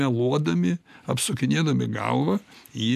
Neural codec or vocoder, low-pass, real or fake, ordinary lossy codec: none; 14.4 kHz; real; MP3, 96 kbps